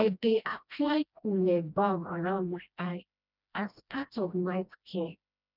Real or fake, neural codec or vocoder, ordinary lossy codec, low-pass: fake; codec, 16 kHz, 1 kbps, FreqCodec, smaller model; none; 5.4 kHz